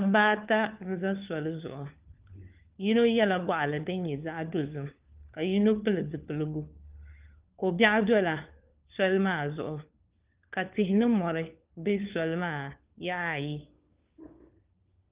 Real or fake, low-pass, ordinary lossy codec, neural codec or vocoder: fake; 3.6 kHz; Opus, 32 kbps; codec, 16 kHz, 4 kbps, FunCodec, trained on LibriTTS, 50 frames a second